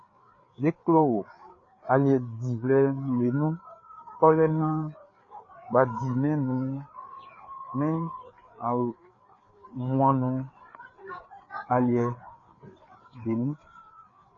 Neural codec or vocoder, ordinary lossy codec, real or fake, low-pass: codec, 16 kHz, 4 kbps, FreqCodec, larger model; AAC, 32 kbps; fake; 7.2 kHz